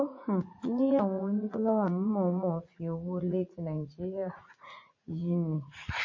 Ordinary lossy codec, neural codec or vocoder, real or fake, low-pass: MP3, 32 kbps; vocoder, 44.1 kHz, 80 mel bands, Vocos; fake; 7.2 kHz